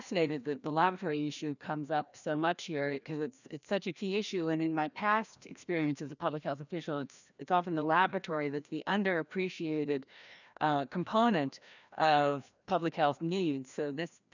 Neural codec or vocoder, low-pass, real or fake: codec, 16 kHz, 1 kbps, FreqCodec, larger model; 7.2 kHz; fake